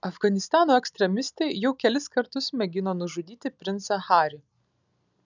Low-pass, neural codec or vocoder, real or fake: 7.2 kHz; none; real